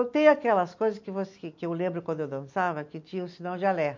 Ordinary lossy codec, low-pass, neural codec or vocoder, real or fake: MP3, 48 kbps; 7.2 kHz; none; real